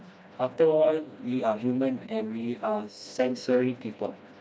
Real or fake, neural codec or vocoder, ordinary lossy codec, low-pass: fake; codec, 16 kHz, 1 kbps, FreqCodec, smaller model; none; none